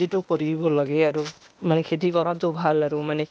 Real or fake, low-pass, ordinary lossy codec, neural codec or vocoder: fake; none; none; codec, 16 kHz, 0.8 kbps, ZipCodec